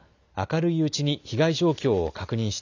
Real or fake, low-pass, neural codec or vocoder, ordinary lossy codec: real; 7.2 kHz; none; none